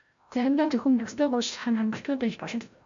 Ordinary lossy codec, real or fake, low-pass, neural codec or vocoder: AAC, 64 kbps; fake; 7.2 kHz; codec, 16 kHz, 0.5 kbps, FreqCodec, larger model